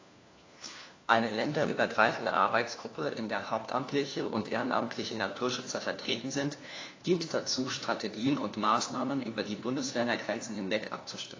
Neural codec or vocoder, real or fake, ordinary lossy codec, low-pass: codec, 16 kHz, 1 kbps, FunCodec, trained on LibriTTS, 50 frames a second; fake; AAC, 32 kbps; 7.2 kHz